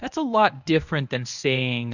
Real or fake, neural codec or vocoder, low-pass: fake; codec, 24 kHz, 0.9 kbps, WavTokenizer, medium speech release version 1; 7.2 kHz